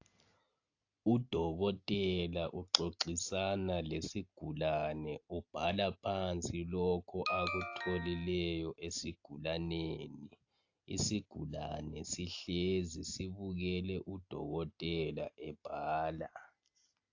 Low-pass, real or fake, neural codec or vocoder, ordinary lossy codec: 7.2 kHz; real; none; AAC, 48 kbps